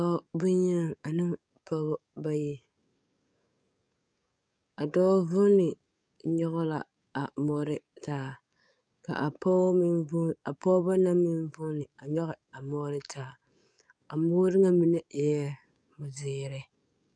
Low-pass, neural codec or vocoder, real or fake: 9.9 kHz; codec, 44.1 kHz, 7.8 kbps, DAC; fake